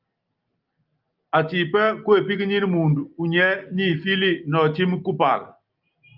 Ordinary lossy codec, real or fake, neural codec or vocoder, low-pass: Opus, 32 kbps; real; none; 5.4 kHz